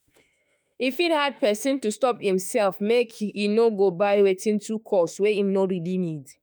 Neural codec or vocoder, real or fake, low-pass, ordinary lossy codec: autoencoder, 48 kHz, 32 numbers a frame, DAC-VAE, trained on Japanese speech; fake; none; none